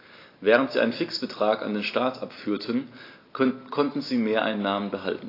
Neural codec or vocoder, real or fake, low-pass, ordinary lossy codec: none; real; 5.4 kHz; AAC, 32 kbps